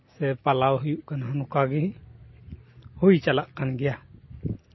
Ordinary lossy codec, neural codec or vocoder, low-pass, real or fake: MP3, 24 kbps; none; 7.2 kHz; real